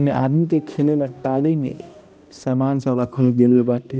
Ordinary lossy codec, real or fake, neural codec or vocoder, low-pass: none; fake; codec, 16 kHz, 1 kbps, X-Codec, HuBERT features, trained on balanced general audio; none